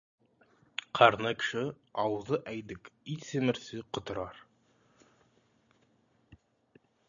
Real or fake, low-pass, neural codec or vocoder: real; 7.2 kHz; none